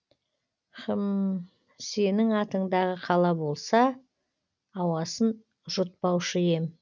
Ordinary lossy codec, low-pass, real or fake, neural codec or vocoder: none; 7.2 kHz; real; none